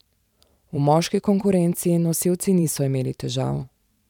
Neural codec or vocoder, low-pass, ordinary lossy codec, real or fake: vocoder, 44.1 kHz, 128 mel bands every 512 samples, BigVGAN v2; 19.8 kHz; none; fake